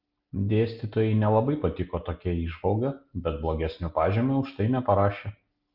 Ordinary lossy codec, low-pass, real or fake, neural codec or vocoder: Opus, 24 kbps; 5.4 kHz; real; none